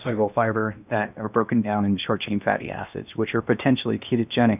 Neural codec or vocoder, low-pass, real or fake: codec, 16 kHz in and 24 kHz out, 0.8 kbps, FocalCodec, streaming, 65536 codes; 3.6 kHz; fake